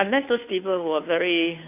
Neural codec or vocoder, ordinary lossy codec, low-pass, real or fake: codec, 16 kHz, 2 kbps, FunCodec, trained on Chinese and English, 25 frames a second; AAC, 32 kbps; 3.6 kHz; fake